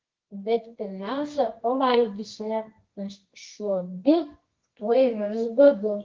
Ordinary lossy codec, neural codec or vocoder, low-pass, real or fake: Opus, 16 kbps; codec, 24 kHz, 0.9 kbps, WavTokenizer, medium music audio release; 7.2 kHz; fake